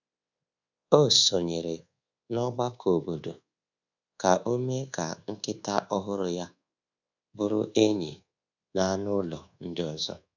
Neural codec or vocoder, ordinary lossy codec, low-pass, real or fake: codec, 24 kHz, 1.2 kbps, DualCodec; none; 7.2 kHz; fake